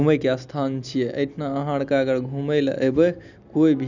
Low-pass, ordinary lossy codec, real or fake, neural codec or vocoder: 7.2 kHz; none; real; none